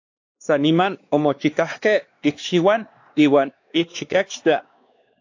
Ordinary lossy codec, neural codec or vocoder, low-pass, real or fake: AAC, 48 kbps; codec, 16 kHz, 2 kbps, X-Codec, WavLM features, trained on Multilingual LibriSpeech; 7.2 kHz; fake